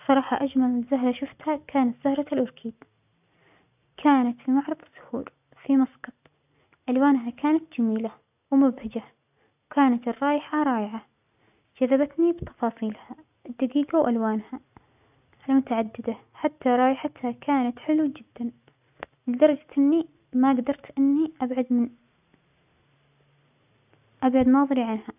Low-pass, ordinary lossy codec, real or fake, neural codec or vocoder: 3.6 kHz; none; real; none